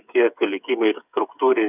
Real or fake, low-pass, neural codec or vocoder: fake; 3.6 kHz; codec, 16 kHz, 8 kbps, FreqCodec, smaller model